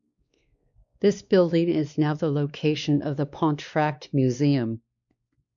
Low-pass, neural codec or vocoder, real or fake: 7.2 kHz; codec, 16 kHz, 2 kbps, X-Codec, WavLM features, trained on Multilingual LibriSpeech; fake